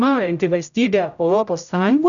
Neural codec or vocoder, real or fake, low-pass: codec, 16 kHz, 0.5 kbps, X-Codec, HuBERT features, trained on general audio; fake; 7.2 kHz